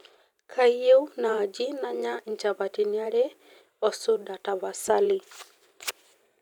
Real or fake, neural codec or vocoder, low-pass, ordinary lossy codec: fake; vocoder, 44.1 kHz, 128 mel bands every 512 samples, BigVGAN v2; 19.8 kHz; none